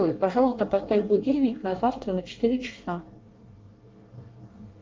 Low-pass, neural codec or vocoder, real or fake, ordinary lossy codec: 7.2 kHz; codec, 24 kHz, 1 kbps, SNAC; fake; Opus, 32 kbps